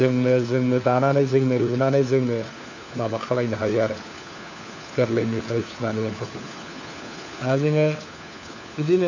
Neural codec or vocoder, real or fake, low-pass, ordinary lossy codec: codec, 16 kHz, 4 kbps, FunCodec, trained on LibriTTS, 50 frames a second; fake; 7.2 kHz; none